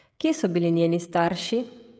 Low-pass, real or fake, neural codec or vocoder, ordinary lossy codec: none; fake; codec, 16 kHz, 16 kbps, FreqCodec, smaller model; none